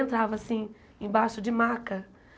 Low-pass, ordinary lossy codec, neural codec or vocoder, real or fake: none; none; none; real